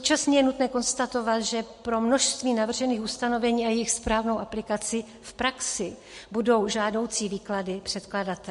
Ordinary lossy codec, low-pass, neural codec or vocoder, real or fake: MP3, 48 kbps; 14.4 kHz; none; real